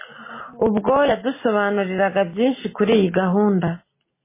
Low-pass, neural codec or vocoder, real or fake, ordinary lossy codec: 3.6 kHz; none; real; MP3, 16 kbps